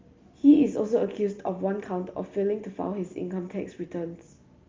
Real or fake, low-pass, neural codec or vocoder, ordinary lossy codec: real; 7.2 kHz; none; Opus, 32 kbps